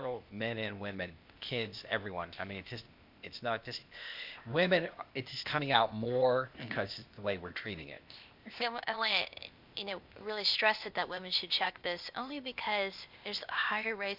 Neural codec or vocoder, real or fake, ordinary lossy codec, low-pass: codec, 16 kHz, 0.8 kbps, ZipCodec; fake; MP3, 48 kbps; 5.4 kHz